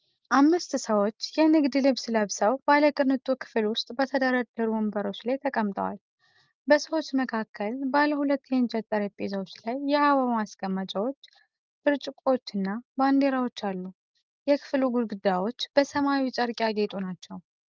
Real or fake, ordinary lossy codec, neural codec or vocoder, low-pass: real; Opus, 32 kbps; none; 7.2 kHz